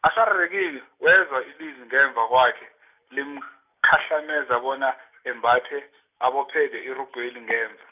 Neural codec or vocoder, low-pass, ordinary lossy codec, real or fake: none; 3.6 kHz; none; real